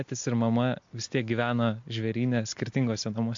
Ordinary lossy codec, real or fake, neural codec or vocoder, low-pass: MP3, 48 kbps; real; none; 7.2 kHz